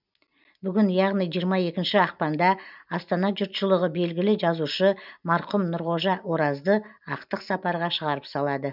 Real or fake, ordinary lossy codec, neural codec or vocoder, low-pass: real; none; none; 5.4 kHz